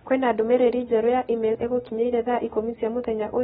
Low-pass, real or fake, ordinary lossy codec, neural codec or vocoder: 14.4 kHz; real; AAC, 16 kbps; none